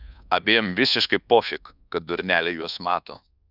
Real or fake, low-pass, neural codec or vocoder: fake; 5.4 kHz; codec, 24 kHz, 1.2 kbps, DualCodec